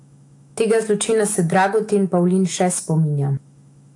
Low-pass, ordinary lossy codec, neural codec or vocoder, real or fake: 10.8 kHz; AAC, 48 kbps; autoencoder, 48 kHz, 128 numbers a frame, DAC-VAE, trained on Japanese speech; fake